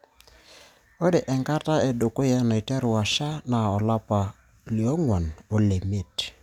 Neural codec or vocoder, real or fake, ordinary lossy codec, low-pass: none; real; none; 19.8 kHz